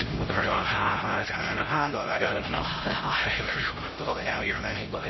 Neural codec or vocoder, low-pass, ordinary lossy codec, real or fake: codec, 16 kHz, 0.5 kbps, X-Codec, HuBERT features, trained on LibriSpeech; 7.2 kHz; MP3, 24 kbps; fake